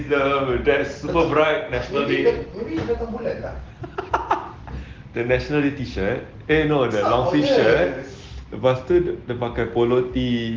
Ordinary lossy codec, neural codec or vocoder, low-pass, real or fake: Opus, 16 kbps; none; 7.2 kHz; real